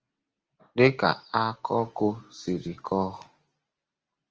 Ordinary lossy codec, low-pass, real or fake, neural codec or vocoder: Opus, 24 kbps; 7.2 kHz; real; none